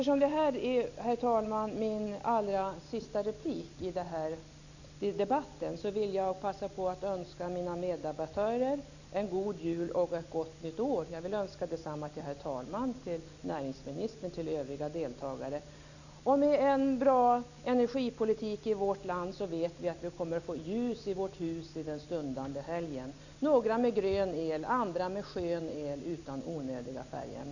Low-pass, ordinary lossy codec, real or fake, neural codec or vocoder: 7.2 kHz; none; real; none